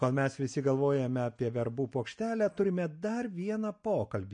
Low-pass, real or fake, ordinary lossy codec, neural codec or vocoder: 9.9 kHz; real; MP3, 48 kbps; none